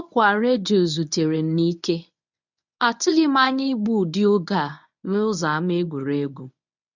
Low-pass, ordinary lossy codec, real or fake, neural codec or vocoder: 7.2 kHz; none; fake; codec, 24 kHz, 0.9 kbps, WavTokenizer, medium speech release version 1